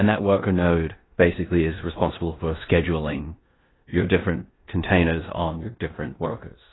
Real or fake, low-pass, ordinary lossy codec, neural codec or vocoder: fake; 7.2 kHz; AAC, 16 kbps; codec, 16 kHz in and 24 kHz out, 0.9 kbps, LongCat-Audio-Codec, four codebook decoder